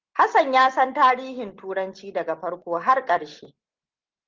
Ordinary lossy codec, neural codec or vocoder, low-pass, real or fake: Opus, 16 kbps; none; 7.2 kHz; real